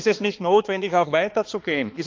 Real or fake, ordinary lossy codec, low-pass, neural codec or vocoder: fake; Opus, 24 kbps; 7.2 kHz; codec, 16 kHz, 2 kbps, X-Codec, HuBERT features, trained on balanced general audio